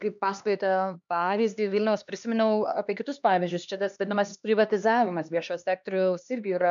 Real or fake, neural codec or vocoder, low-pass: fake; codec, 16 kHz, 2 kbps, X-Codec, HuBERT features, trained on LibriSpeech; 7.2 kHz